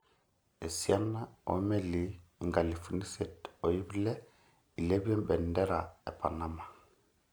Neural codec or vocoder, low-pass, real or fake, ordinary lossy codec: none; none; real; none